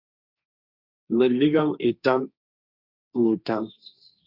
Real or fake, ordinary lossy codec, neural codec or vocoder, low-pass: fake; Opus, 64 kbps; codec, 16 kHz, 1.1 kbps, Voila-Tokenizer; 5.4 kHz